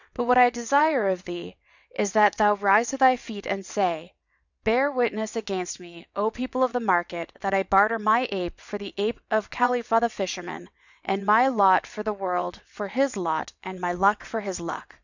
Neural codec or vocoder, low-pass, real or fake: vocoder, 22.05 kHz, 80 mel bands, WaveNeXt; 7.2 kHz; fake